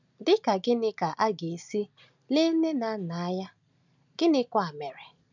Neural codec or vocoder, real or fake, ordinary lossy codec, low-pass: none; real; none; 7.2 kHz